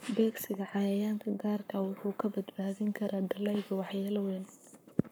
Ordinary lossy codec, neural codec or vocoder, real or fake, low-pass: none; codec, 44.1 kHz, 7.8 kbps, Pupu-Codec; fake; none